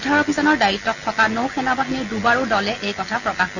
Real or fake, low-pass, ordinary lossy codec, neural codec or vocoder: real; 7.2 kHz; none; none